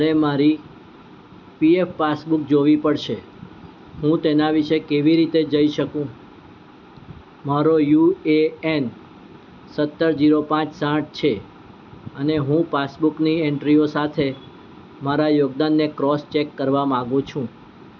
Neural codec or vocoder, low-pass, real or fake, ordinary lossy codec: none; 7.2 kHz; real; none